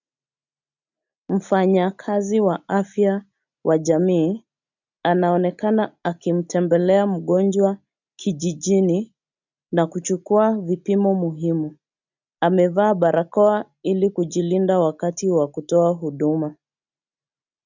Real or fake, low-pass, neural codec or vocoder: real; 7.2 kHz; none